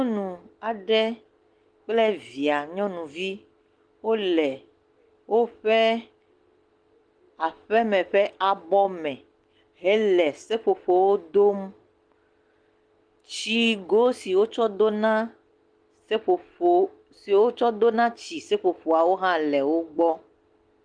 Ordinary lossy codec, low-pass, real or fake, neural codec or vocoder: Opus, 24 kbps; 9.9 kHz; real; none